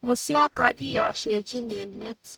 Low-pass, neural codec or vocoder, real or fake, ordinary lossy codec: none; codec, 44.1 kHz, 0.9 kbps, DAC; fake; none